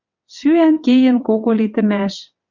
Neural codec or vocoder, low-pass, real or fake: vocoder, 22.05 kHz, 80 mel bands, WaveNeXt; 7.2 kHz; fake